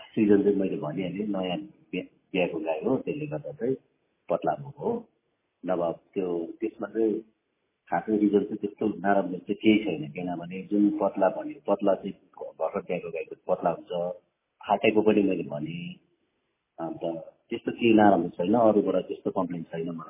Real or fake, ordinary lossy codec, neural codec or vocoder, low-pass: real; MP3, 16 kbps; none; 3.6 kHz